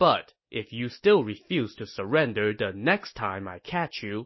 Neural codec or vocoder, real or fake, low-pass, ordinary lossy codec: none; real; 7.2 kHz; MP3, 24 kbps